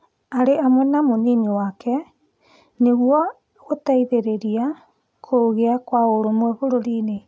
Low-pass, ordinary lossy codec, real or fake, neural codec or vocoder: none; none; real; none